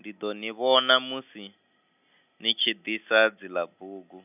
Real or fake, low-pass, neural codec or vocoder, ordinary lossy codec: real; 3.6 kHz; none; none